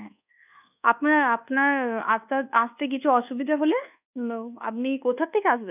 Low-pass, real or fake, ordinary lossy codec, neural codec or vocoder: 3.6 kHz; fake; none; codec, 24 kHz, 1.2 kbps, DualCodec